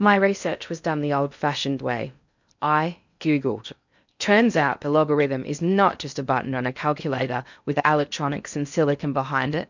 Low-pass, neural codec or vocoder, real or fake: 7.2 kHz; codec, 16 kHz in and 24 kHz out, 0.6 kbps, FocalCodec, streaming, 2048 codes; fake